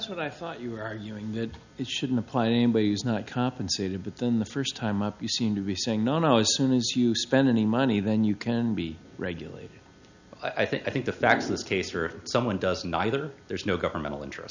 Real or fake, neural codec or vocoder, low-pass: real; none; 7.2 kHz